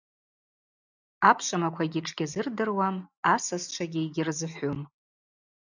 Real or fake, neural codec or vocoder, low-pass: real; none; 7.2 kHz